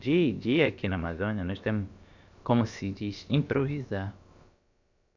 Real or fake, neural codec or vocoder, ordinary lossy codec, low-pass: fake; codec, 16 kHz, about 1 kbps, DyCAST, with the encoder's durations; none; 7.2 kHz